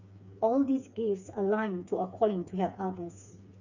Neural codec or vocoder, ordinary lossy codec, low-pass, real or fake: codec, 16 kHz, 4 kbps, FreqCodec, smaller model; none; 7.2 kHz; fake